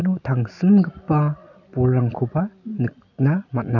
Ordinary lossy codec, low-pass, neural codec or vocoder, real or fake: none; 7.2 kHz; none; real